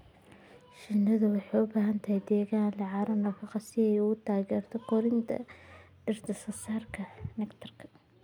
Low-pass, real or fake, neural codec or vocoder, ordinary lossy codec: 19.8 kHz; real; none; none